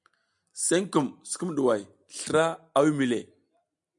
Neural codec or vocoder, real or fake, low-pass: none; real; 10.8 kHz